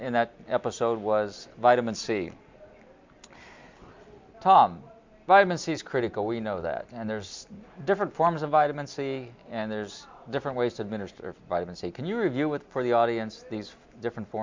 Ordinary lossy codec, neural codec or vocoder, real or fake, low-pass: MP3, 64 kbps; none; real; 7.2 kHz